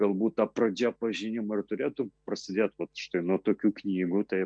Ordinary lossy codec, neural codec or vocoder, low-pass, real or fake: MP3, 64 kbps; none; 9.9 kHz; real